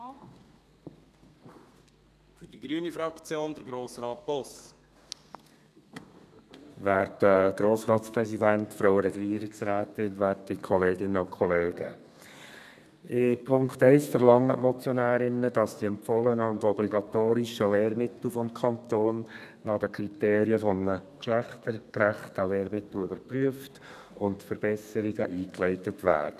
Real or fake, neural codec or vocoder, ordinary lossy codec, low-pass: fake; codec, 32 kHz, 1.9 kbps, SNAC; none; 14.4 kHz